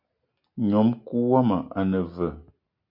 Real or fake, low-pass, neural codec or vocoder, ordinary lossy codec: real; 5.4 kHz; none; MP3, 48 kbps